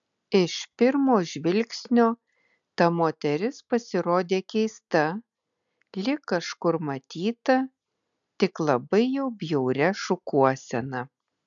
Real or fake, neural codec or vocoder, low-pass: real; none; 7.2 kHz